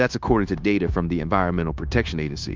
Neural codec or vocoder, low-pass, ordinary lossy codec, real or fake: codec, 16 kHz, 0.9 kbps, LongCat-Audio-Codec; 7.2 kHz; Opus, 24 kbps; fake